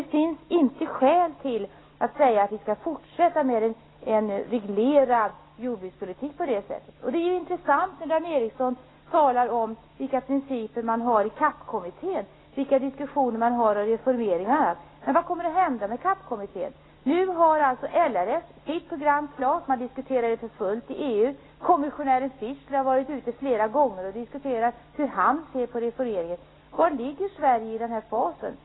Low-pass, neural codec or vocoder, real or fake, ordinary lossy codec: 7.2 kHz; none; real; AAC, 16 kbps